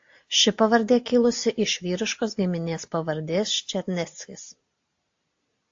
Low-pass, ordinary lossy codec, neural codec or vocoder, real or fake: 7.2 kHz; AAC, 48 kbps; none; real